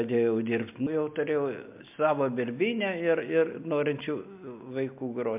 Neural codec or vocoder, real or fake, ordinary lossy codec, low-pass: none; real; MP3, 32 kbps; 3.6 kHz